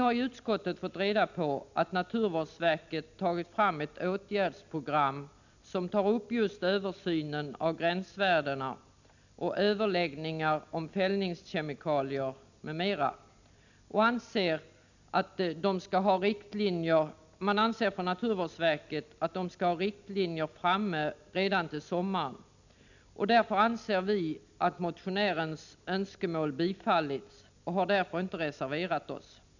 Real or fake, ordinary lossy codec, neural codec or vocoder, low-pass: real; none; none; 7.2 kHz